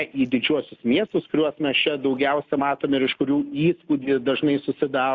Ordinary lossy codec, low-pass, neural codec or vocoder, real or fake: Opus, 64 kbps; 7.2 kHz; none; real